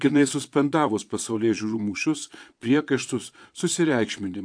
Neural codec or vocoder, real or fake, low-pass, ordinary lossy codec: vocoder, 22.05 kHz, 80 mel bands, Vocos; fake; 9.9 kHz; AAC, 64 kbps